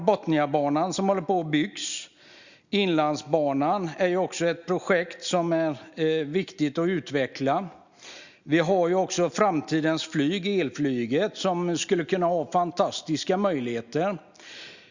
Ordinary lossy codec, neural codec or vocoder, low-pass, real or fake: Opus, 64 kbps; none; 7.2 kHz; real